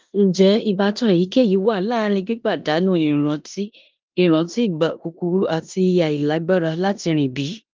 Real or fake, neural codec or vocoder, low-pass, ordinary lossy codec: fake; codec, 16 kHz in and 24 kHz out, 0.9 kbps, LongCat-Audio-Codec, four codebook decoder; 7.2 kHz; Opus, 24 kbps